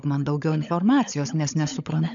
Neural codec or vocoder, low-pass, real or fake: codec, 16 kHz, 16 kbps, FunCodec, trained on LibriTTS, 50 frames a second; 7.2 kHz; fake